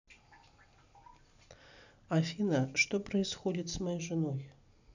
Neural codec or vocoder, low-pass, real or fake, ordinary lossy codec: none; 7.2 kHz; real; none